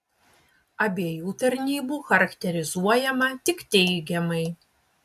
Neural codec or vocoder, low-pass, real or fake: none; 14.4 kHz; real